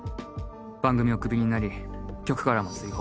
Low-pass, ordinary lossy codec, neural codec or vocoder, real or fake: none; none; none; real